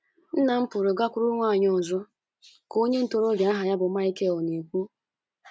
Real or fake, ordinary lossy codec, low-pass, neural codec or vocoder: real; none; none; none